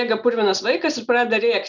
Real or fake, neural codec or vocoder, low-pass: real; none; 7.2 kHz